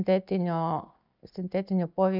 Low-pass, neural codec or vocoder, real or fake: 5.4 kHz; none; real